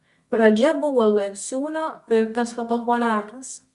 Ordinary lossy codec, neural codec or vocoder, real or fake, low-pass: Opus, 64 kbps; codec, 24 kHz, 0.9 kbps, WavTokenizer, medium music audio release; fake; 10.8 kHz